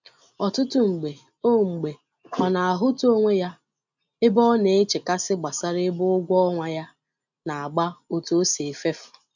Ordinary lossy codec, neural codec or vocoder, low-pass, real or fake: none; none; 7.2 kHz; real